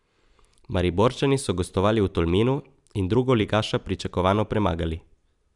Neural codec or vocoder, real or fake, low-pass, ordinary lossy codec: vocoder, 44.1 kHz, 128 mel bands every 256 samples, BigVGAN v2; fake; 10.8 kHz; none